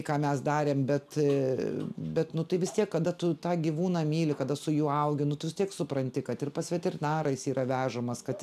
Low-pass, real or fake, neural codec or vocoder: 14.4 kHz; real; none